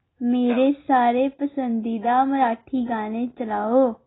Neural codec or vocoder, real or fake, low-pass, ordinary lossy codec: none; real; 7.2 kHz; AAC, 16 kbps